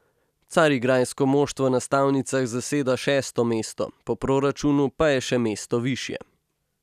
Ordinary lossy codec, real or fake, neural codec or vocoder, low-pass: none; real; none; 14.4 kHz